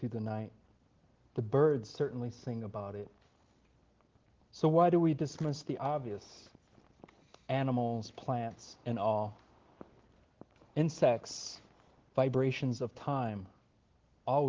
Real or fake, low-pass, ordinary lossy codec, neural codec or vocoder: real; 7.2 kHz; Opus, 16 kbps; none